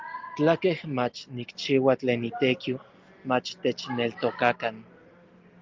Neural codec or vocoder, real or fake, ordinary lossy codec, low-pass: none; real; Opus, 24 kbps; 7.2 kHz